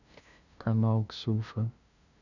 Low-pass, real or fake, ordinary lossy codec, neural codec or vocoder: 7.2 kHz; fake; none; codec, 16 kHz, 0.5 kbps, FunCodec, trained on LibriTTS, 25 frames a second